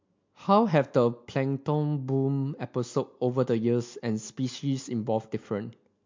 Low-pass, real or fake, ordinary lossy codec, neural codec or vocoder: 7.2 kHz; real; MP3, 48 kbps; none